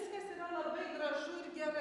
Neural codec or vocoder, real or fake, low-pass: none; real; 10.8 kHz